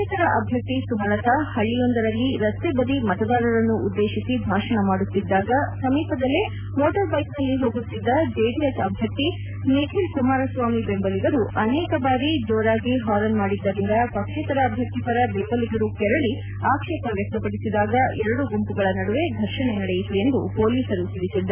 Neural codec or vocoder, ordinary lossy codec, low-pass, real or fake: none; none; 3.6 kHz; real